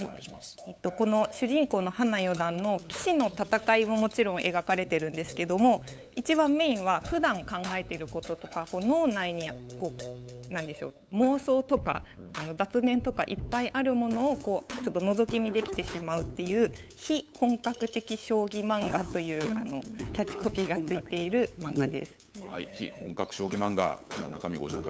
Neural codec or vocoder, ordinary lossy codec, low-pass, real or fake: codec, 16 kHz, 8 kbps, FunCodec, trained on LibriTTS, 25 frames a second; none; none; fake